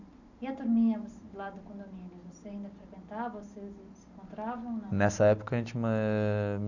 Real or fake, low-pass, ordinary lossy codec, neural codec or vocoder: real; 7.2 kHz; none; none